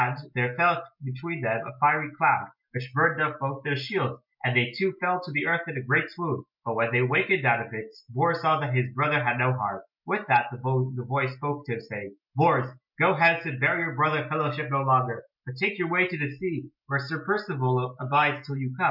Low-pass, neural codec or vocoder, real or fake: 5.4 kHz; none; real